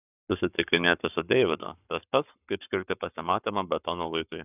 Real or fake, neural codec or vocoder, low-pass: fake; codec, 44.1 kHz, 7.8 kbps, Pupu-Codec; 3.6 kHz